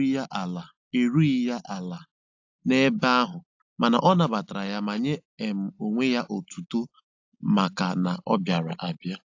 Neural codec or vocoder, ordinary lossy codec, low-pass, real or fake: none; AAC, 48 kbps; 7.2 kHz; real